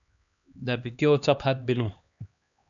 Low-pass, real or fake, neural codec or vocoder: 7.2 kHz; fake; codec, 16 kHz, 2 kbps, X-Codec, HuBERT features, trained on LibriSpeech